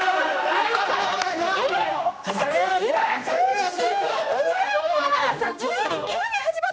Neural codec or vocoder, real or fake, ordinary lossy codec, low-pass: codec, 16 kHz, 1 kbps, X-Codec, HuBERT features, trained on general audio; fake; none; none